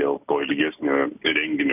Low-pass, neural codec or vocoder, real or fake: 3.6 kHz; none; real